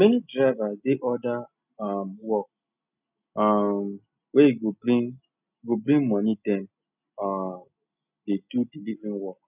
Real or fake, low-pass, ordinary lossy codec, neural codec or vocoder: real; 3.6 kHz; none; none